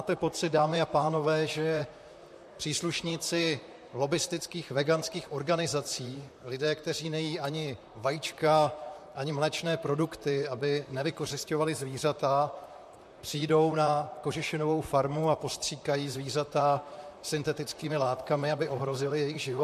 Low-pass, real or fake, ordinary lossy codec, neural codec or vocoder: 14.4 kHz; fake; MP3, 64 kbps; vocoder, 44.1 kHz, 128 mel bands, Pupu-Vocoder